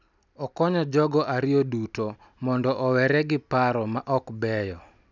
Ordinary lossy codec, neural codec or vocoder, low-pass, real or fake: none; none; 7.2 kHz; real